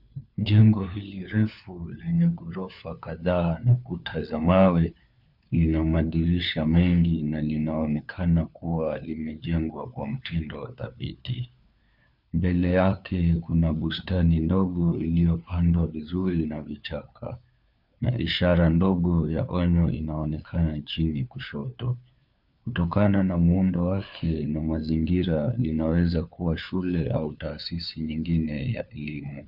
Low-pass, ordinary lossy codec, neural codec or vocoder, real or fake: 5.4 kHz; Opus, 64 kbps; codec, 16 kHz, 4 kbps, FunCodec, trained on LibriTTS, 50 frames a second; fake